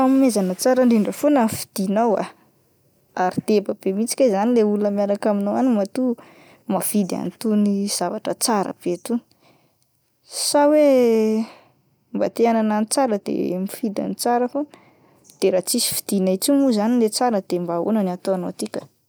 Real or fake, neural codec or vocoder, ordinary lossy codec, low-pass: real; none; none; none